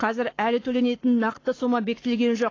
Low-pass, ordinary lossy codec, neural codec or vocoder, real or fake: 7.2 kHz; AAC, 32 kbps; codec, 44.1 kHz, 7.8 kbps, Pupu-Codec; fake